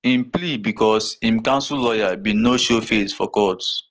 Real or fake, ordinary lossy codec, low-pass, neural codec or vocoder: real; Opus, 16 kbps; 7.2 kHz; none